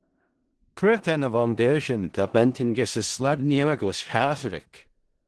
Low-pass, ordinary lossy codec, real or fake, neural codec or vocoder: 10.8 kHz; Opus, 16 kbps; fake; codec, 16 kHz in and 24 kHz out, 0.4 kbps, LongCat-Audio-Codec, four codebook decoder